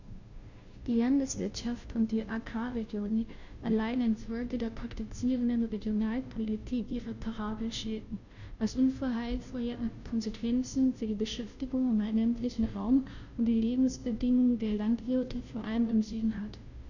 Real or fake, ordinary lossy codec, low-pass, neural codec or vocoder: fake; none; 7.2 kHz; codec, 16 kHz, 0.5 kbps, FunCodec, trained on Chinese and English, 25 frames a second